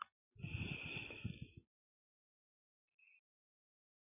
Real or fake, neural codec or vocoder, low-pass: real; none; 3.6 kHz